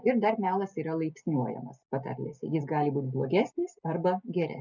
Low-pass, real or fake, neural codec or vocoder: 7.2 kHz; real; none